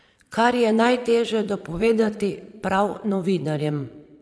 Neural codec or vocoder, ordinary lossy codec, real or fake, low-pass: vocoder, 22.05 kHz, 80 mel bands, Vocos; none; fake; none